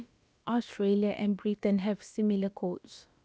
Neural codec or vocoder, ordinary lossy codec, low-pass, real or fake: codec, 16 kHz, about 1 kbps, DyCAST, with the encoder's durations; none; none; fake